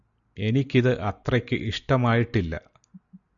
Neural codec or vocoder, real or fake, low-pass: none; real; 7.2 kHz